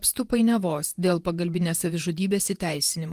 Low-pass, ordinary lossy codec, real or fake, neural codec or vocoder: 14.4 kHz; Opus, 24 kbps; fake; vocoder, 48 kHz, 128 mel bands, Vocos